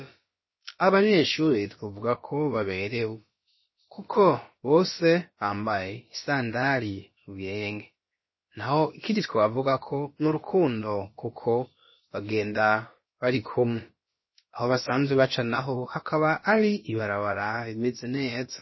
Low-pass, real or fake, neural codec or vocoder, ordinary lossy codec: 7.2 kHz; fake; codec, 16 kHz, about 1 kbps, DyCAST, with the encoder's durations; MP3, 24 kbps